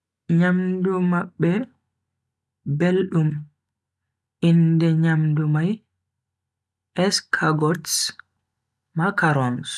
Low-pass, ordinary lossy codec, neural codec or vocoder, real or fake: none; none; none; real